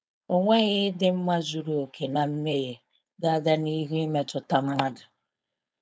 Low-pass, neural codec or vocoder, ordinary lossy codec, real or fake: none; codec, 16 kHz, 4.8 kbps, FACodec; none; fake